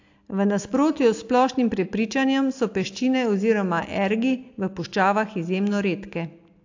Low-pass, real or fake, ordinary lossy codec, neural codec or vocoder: 7.2 kHz; real; AAC, 48 kbps; none